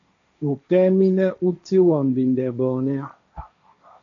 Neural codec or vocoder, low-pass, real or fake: codec, 16 kHz, 1.1 kbps, Voila-Tokenizer; 7.2 kHz; fake